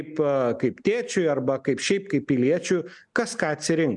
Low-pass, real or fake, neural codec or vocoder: 10.8 kHz; real; none